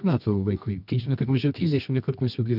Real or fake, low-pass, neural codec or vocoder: fake; 5.4 kHz; codec, 24 kHz, 0.9 kbps, WavTokenizer, medium music audio release